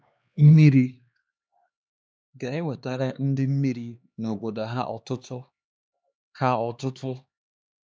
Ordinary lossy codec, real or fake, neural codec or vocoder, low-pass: none; fake; codec, 16 kHz, 2 kbps, X-Codec, HuBERT features, trained on LibriSpeech; none